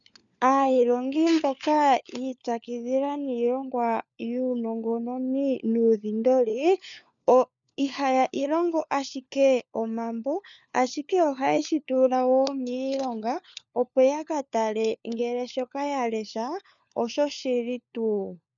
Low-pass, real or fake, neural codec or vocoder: 7.2 kHz; fake; codec, 16 kHz, 4 kbps, FunCodec, trained on LibriTTS, 50 frames a second